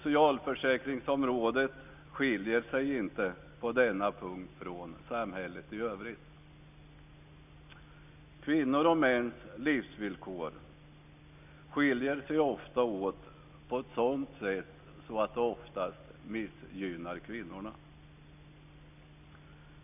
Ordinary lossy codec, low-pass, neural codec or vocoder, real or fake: none; 3.6 kHz; none; real